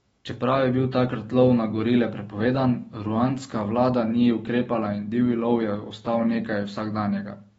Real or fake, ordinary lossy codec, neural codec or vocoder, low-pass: fake; AAC, 24 kbps; autoencoder, 48 kHz, 128 numbers a frame, DAC-VAE, trained on Japanese speech; 19.8 kHz